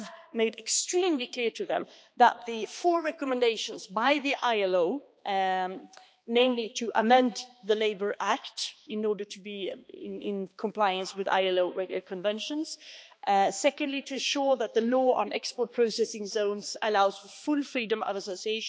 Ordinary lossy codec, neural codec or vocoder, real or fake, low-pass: none; codec, 16 kHz, 2 kbps, X-Codec, HuBERT features, trained on balanced general audio; fake; none